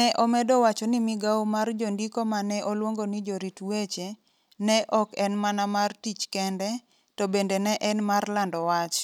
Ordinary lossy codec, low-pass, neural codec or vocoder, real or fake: none; 19.8 kHz; none; real